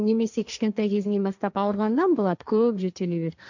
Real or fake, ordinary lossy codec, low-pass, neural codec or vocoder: fake; none; 7.2 kHz; codec, 16 kHz, 1.1 kbps, Voila-Tokenizer